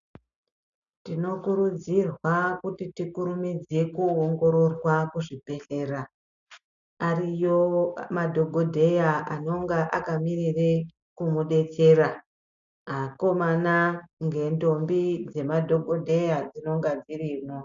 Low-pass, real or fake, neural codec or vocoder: 7.2 kHz; real; none